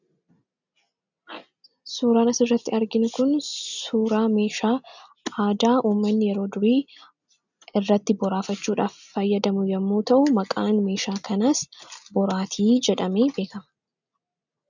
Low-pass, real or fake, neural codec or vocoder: 7.2 kHz; real; none